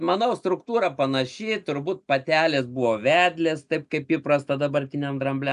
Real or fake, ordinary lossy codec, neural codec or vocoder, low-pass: real; AAC, 96 kbps; none; 10.8 kHz